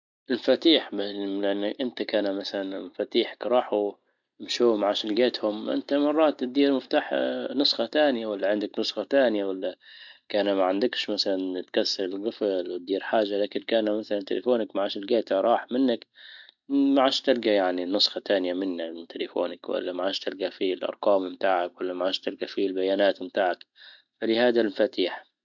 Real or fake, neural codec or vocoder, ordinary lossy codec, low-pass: real; none; MP3, 64 kbps; 7.2 kHz